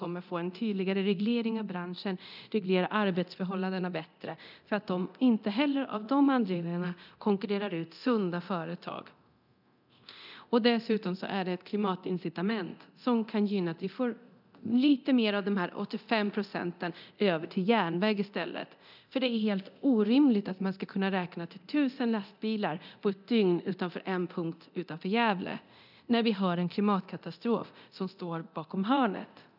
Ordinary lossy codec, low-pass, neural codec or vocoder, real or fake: none; 5.4 kHz; codec, 24 kHz, 0.9 kbps, DualCodec; fake